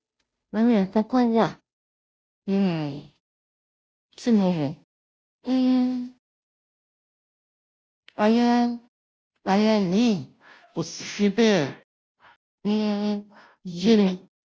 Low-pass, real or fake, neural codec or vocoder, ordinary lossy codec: none; fake; codec, 16 kHz, 0.5 kbps, FunCodec, trained on Chinese and English, 25 frames a second; none